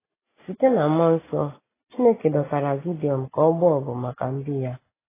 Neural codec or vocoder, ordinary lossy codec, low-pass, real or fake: none; AAC, 16 kbps; 3.6 kHz; real